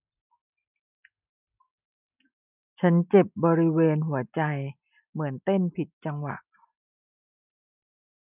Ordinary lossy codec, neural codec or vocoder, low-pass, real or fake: none; none; 3.6 kHz; real